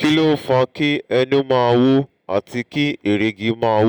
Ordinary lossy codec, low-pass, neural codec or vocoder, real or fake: none; 19.8 kHz; none; real